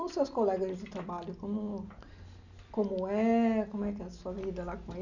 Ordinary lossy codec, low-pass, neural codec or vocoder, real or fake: none; 7.2 kHz; none; real